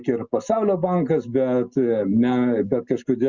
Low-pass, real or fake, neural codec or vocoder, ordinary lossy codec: 7.2 kHz; real; none; Opus, 64 kbps